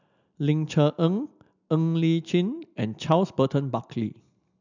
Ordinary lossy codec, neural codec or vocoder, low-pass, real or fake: none; none; 7.2 kHz; real